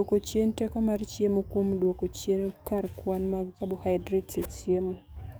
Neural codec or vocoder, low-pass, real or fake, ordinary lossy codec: codec, 44.1 kHz, 7.8 kbps, DAC; none; fake; none